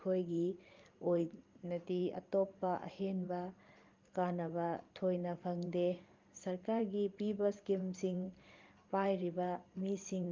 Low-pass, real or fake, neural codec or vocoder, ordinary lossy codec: 7.2 kHz; fake; vocoder, 44.1 kHz, 128 mel bands every 512 samples, BigVGAN v2; Opus, 32 kbps